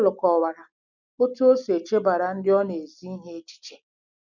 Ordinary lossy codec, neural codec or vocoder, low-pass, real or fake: none; none; 7.2 kHz; real